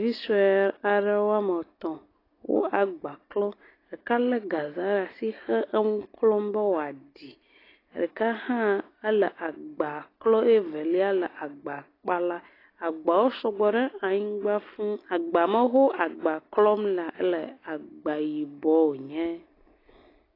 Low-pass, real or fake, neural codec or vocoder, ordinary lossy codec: 5.4 kHz; real; none; AAC, 24 kbps